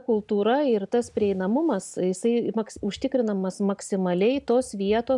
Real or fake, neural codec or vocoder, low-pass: real; none; 10.8 kHz